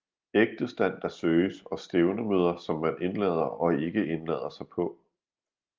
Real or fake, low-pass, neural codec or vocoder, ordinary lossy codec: real; 7.2 kHz; none; Opus, 32 kbps